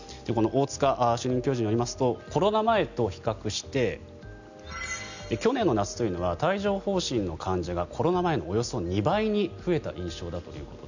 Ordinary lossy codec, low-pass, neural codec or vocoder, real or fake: none; 7.2 kHz; none; real